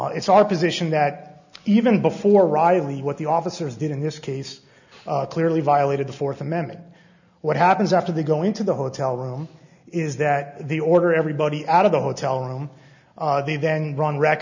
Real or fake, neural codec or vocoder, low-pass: real; none; 7.2 kHz